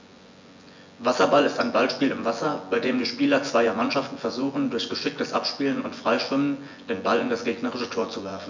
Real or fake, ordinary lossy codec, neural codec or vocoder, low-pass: fake; MP3, 64 kbps; vocoder, 24 kHz, 100 mel bands, Vocos; 7.2 kHz